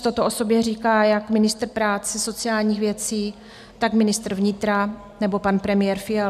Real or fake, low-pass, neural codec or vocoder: real; 14.4 kHz; none